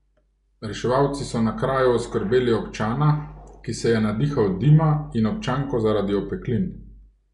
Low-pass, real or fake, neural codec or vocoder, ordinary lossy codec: 10.8 kHz; real; none; none